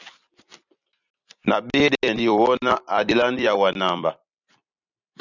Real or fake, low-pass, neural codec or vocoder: fake; 7.2 kHz; vocoder, 44.1 kHz, 128 mel bands every 256 samples, BigVGAN v2